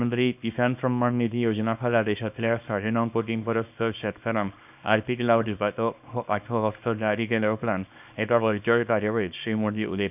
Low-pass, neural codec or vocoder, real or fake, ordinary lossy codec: 3.6 kHz; codec, 24 kHz, 0.9 kbps, WavTokenizer, small release; fake; none